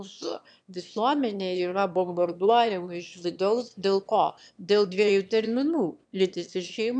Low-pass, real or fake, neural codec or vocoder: 9.9 kHz; fake; autoencoder, 22.05 kHz, a latent of 192 numbers a frame, VITS, trained on one speaker